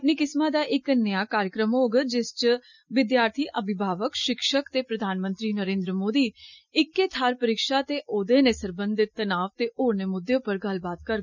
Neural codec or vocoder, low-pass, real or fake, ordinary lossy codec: none; 7.2 kHz; real; none